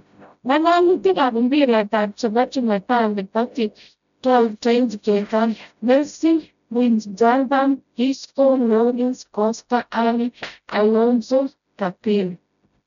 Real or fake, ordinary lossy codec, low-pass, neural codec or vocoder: fake; none; 7.2 kHz; codec, 16 kHz, 0.5 kbps, FreqCodec, smaller model